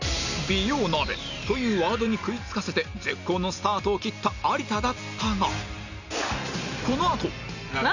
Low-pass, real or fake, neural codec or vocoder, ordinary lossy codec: 7.2 kHz; real; none; none